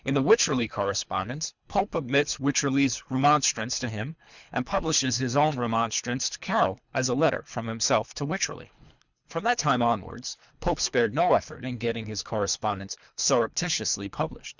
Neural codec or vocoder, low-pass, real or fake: codec, 24 kHz, 3 kbps, HILCodec; 7.2 kHz; fake